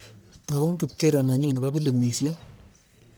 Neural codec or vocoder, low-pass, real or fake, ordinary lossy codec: codec, 44.1 kHz, 1.7 kbps, Pupu-Codec; none; fake; none